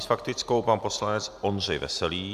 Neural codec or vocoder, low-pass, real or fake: none; 14.4 kHz; real